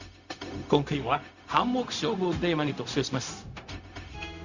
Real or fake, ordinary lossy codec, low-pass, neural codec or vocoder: fake; Opus, 64 kbps; 7.2 kHz; codec, 16 kHz, 0.4 kbps, LongCat-Audio-Codec